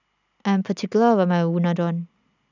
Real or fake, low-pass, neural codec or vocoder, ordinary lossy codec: real; 7.2 kHz; none; none